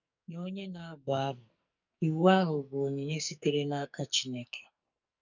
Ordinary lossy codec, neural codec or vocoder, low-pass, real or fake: none; codec, 44.1 kHz, 2.6 kbps, SNAC; 7.2 kHz; fake